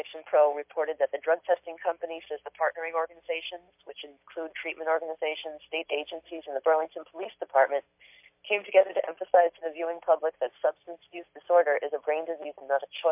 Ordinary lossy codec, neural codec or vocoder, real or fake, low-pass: MP3, 32 kbps; none; real; 3.6 kHz